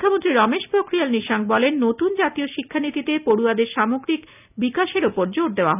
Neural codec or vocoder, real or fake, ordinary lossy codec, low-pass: none; real; none; 3.6 kHz